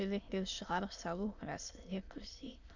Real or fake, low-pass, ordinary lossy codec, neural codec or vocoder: fake; 7.2 kHz; none; autoencoder, 22.05 kHz, a latent of 192 numbers a frame, VITS, trained on many speakers